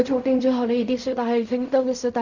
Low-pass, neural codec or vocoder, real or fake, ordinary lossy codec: 7.2 kHz; codec, 16 kHz in and 24 kHz out, 0.4 kbps, LongCat-Audio-Codec, fine tuned four codebook decoder; fake; none